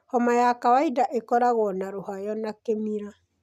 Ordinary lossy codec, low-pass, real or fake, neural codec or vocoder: none; 14.4 kHz; real; none